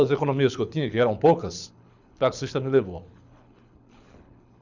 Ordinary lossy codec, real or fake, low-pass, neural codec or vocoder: none; fake; 7.2 kHz; codec, 24 kHz, 6 kbps, HILCodec